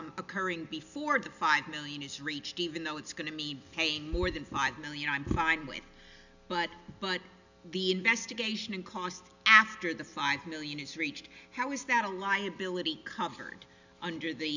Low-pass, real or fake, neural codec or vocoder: 7.2 kHz; real; none